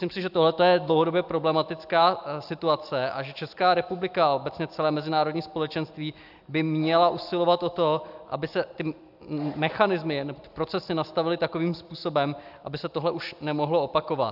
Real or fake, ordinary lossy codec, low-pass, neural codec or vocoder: real; AAC, 48 kbps; 5.4 kHz; none